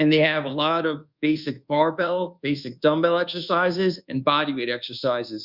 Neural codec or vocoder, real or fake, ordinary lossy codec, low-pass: codec, 24 kHz, 1.2 kbps, DualCodec; fake; Opus, 64 kbps; 5.4 kHz